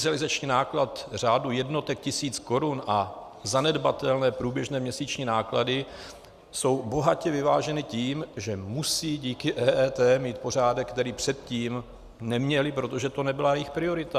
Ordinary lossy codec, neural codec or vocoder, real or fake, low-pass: Opus, 64 kbps; none; real; 14.4 kHz